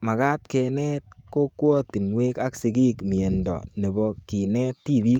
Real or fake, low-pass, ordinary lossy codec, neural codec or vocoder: fake; 19.8 kHz; none; codec, 44.1 kHz, 7.8 kbps, DAC